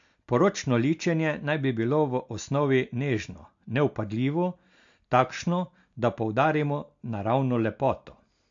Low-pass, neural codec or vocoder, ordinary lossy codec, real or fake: 7.2 kHz; none; AAC, 64 kbps; real